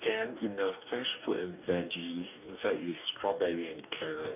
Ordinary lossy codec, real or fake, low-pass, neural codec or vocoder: none; fake; 3.6 kHz; codec, 44.1 kHz, 2.6 kbps, DAC